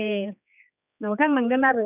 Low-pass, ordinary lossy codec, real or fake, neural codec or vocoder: 3.6 kHz; none; fake; codec, 16 kHz, 4 kbps, X-Codec, HuBERT features, trained on general audio